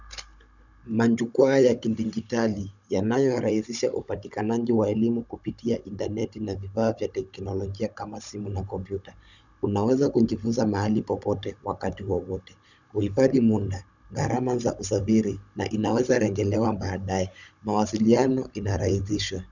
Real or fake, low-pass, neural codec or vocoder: fake; 7.2 kHz; codec, 16 kHz, 16 kbps, FunCodec, trained on Chinese and English, 50 frames a second